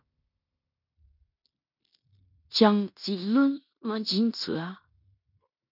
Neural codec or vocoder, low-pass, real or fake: codec, 16 kHz in and 24 kHz out, 0.9 kbps, LongCat-Audio-Codec, fine tuned four codebook decoder; 5.4 kHz; fake